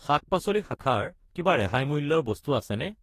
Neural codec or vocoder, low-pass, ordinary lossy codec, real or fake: codec, 44.1 kHz, 2.6 kbps, DAC; 14.4 kHz; AAC, 48 kbps; fake